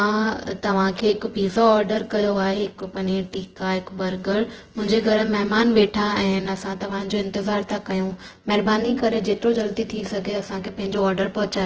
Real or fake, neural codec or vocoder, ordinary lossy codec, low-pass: fake; vocoder, 24 kHz, 100 mel bands, Vocos; Opus, 16 kbps; 7.2 kHz